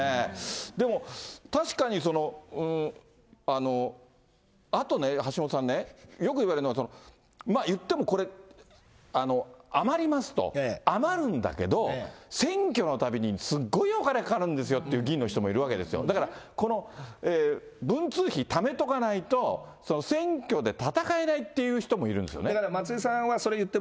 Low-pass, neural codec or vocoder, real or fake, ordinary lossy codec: none; none; real; none